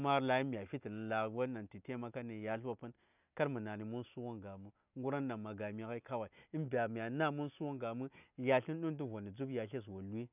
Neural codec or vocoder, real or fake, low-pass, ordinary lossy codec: none; real; 3.6 kHz; none